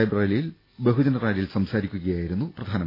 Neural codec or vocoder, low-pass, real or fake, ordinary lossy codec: none; 5.4 kHz; real; AAC, 24 kbps